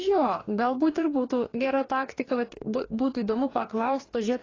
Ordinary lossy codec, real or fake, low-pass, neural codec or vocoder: AAC, 32 kbps; fake; 7.2 kHz; codec, 44.1 kHz, 2.6 kbps, DAC